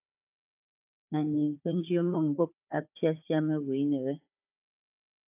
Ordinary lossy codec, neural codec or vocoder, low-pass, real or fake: AAC, 32 kbps; codec, 16 kHz, 4 kbps, FunCodec, trained on Chinese and English, 50 frames a second; 3.6 kHz; fake